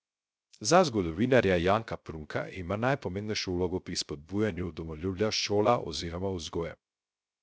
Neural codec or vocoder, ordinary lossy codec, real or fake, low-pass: codec, 16 kHz, 0.3 kbps, FocalCodec; none; fake; none